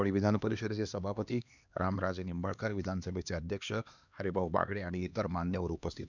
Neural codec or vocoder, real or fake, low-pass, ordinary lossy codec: codec, 16 kHz, 2 kbps, X-Codec, HuBERT features, trained on LibriSpeech; fake; 7.2 kHz; none